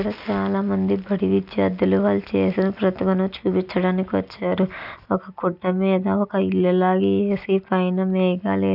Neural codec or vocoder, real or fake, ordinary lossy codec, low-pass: none; real; none; 5.4 kHz